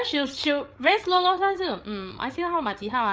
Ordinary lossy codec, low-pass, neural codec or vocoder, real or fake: none; none; codec, 16 kHz, 8 kbps, FreqCodec, larger model; fake